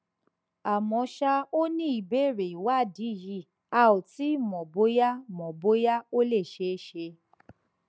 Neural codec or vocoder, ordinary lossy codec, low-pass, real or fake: none; none; none; real